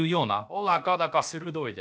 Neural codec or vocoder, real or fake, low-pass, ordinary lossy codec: codec, 16 kHz, about 1 kbps, DyCAST, with the encoder's durations; fake; none; none